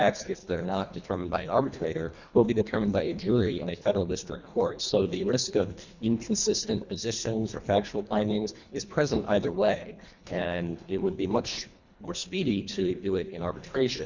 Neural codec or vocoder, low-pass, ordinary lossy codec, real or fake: codec, 24 kHz, 1.5 kbps, HILCodec; 7.2 kHz; Opus, 64 kbps; fake